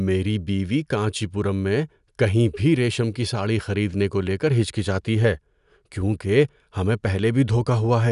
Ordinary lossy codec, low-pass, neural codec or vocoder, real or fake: none; 10.8 kHz; none; real